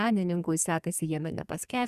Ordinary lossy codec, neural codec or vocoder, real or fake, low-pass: Opus, 64 kbps; codec, 44.1 kHz, 2.6 kbps, SNAC; fake; 14.4 kHz